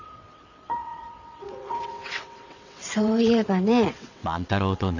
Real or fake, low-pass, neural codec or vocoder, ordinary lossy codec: fake; 7.2 kHz; vocoder, 22.05 kHz, 80 mel bands, WaveNeXt; none